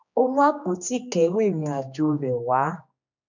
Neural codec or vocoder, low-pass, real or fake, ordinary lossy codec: codec, 16 kHz, 2 kbps, X-Codec, HuBERT features, trained on general audio; 7.2 kHz; fake; none